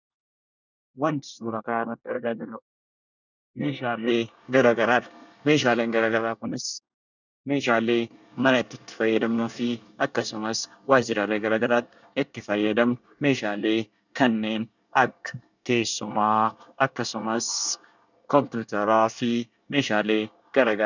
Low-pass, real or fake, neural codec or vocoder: 7.2 kHz; fake; codec, 24 kHz, 1 kbps, SNAC